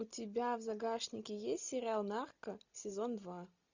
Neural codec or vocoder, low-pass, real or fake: none; 7.2 kHz; real